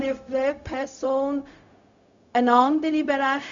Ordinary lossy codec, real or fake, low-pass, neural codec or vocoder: Opus, 64 kbps; fake; 7.2 kHz; codec, 16 kHz, 0.4 kbps, LongCat-Audio-Codec